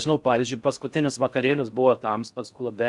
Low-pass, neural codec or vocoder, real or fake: 10.8 kHz; codec, 16 kHz in and 24 kHz out, 0.6 kbps, FocalCodec, streaming, 4096 codes; fake